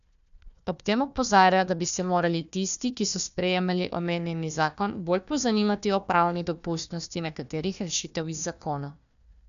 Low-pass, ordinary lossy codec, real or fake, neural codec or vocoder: 7.2 kHz; none; fake; codec, 16 kHz, 1 kbps, FunCodec, trained on Chinese and English, 50 frames a second